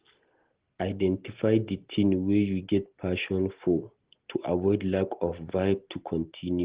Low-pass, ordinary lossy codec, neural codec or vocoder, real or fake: 3.6 kHz; Opus, 16 kbps; none; real